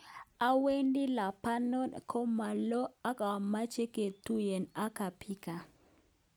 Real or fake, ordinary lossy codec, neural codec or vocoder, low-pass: real; none; none; none